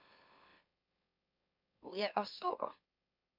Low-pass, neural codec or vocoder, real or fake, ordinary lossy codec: 5.4 kHz; autoencoder, 44.1 kHz, a latent of 192 numbers a frame, MeloTTS; fake; MP3, 48 kbps